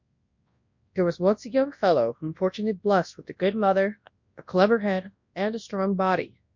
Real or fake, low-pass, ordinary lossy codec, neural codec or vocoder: fake; 7.2 kHz; MP3, 48 kbps; codec, 24 kHz, 0.9 kbps, WavTokenizer, large speech release